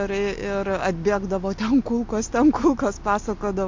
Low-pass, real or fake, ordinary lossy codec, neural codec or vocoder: 7.2 kHz; real; MP3, 48 kbps; none